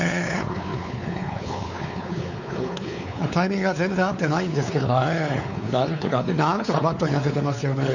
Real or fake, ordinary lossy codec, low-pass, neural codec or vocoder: fake; none; 7.2 kHz; codec, 16 kHz, 4 kbps, X-Codec, WavLM features, trained on Multilingual LibriSpeech